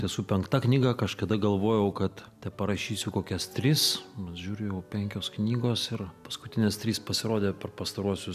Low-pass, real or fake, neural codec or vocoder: 14.4 kHz; real; none